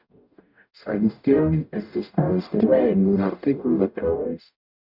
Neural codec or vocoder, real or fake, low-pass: codec, 44.1 kHz, 0.9 kbps, DAC; fake; 5.4 kHz